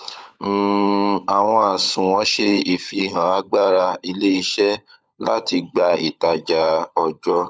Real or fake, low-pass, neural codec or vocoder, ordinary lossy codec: fake; none; codec, 16 kHz, 16 kbps, FunCodec, trained on LibriTTS, 50 frames a second; none